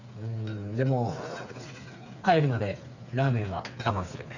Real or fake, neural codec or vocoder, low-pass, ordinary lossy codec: fake; codec, 16 kHz, 4 kbps, FreqCodec, smaller model; 7.2 kHz; none